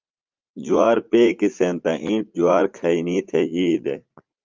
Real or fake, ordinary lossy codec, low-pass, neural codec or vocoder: fake; Opus, 24 kbps; 7.2 kHz; vocoder, 44.1 kHz, 80 mel bands, Vocos